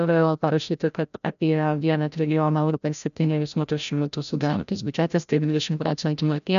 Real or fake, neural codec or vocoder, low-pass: fake; codec, 16 kHz, 0.5 kbps, FreqCodec, larger model; 7.2 kHz